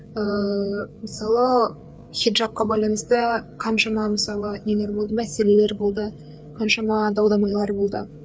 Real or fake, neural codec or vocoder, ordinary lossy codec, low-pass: fake; codec, 16 kHz, 4 kbps, FreqCodec, larger model; none; none